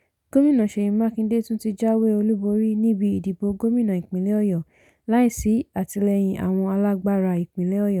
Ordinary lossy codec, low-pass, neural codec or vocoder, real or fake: none; 19.8 kHz; none; real